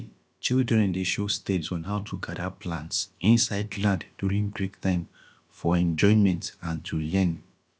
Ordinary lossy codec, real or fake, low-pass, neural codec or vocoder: none; fake; none; codec, 16 kHz, about 1 kbps, DyCAST, with the encoder's durations